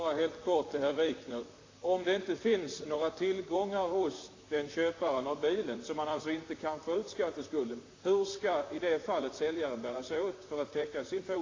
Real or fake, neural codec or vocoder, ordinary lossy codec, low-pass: fake; vocoder, 44.1 kHz, 128 mel bands, Pupu-Vocoder; AAC, 32 kbps; 7.2 kHz